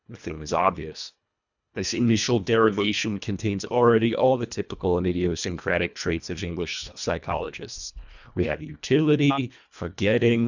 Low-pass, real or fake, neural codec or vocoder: 7.2 kHz; fake; codec, 24 kHz, 1.5 kbps, HILCodec